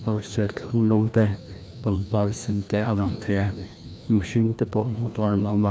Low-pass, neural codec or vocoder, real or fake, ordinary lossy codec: none; codec, 16 kHz, 1 kbps, FreqCodec, larger model; fake; none